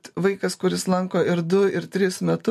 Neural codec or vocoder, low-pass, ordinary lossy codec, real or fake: none; 14.4 kHz; MP3, 64 kbps; real